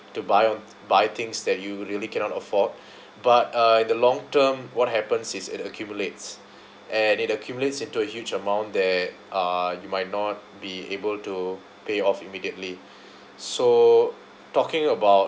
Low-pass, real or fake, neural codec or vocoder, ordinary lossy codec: none; real; none; none